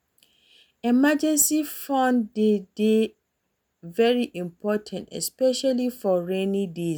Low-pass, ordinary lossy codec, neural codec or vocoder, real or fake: none; none; none; real